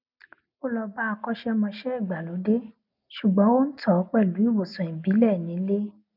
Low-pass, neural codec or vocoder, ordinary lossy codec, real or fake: 5.4 kHz; none; none; real